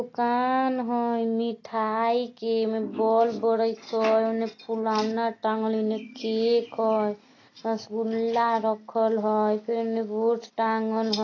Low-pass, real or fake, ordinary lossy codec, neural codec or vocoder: 7.2 kHz; real; AAC, 32 kbps; none